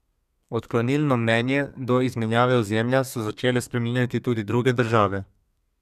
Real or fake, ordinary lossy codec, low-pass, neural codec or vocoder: fake; none; 14.4 kHz; codec, 32 kHz, 1.9 kbps, SNAC